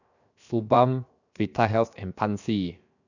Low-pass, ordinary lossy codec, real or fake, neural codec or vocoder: 7.2 kHz; none; fake; codec, 16 kHz, 0.7 kbps, FocalCodec